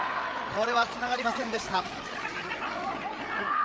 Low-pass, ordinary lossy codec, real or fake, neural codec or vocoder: none; none; fake; codec, 16 kHz, 8 kbps, FreqCodec, larger model